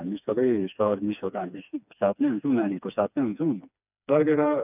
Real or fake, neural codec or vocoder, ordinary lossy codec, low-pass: fake; codec, 16 kHz, 2 kbps, FreqCodec, smaller model; none; 3.6 kHz